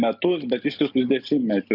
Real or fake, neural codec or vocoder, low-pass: real; none; 5.4 kHz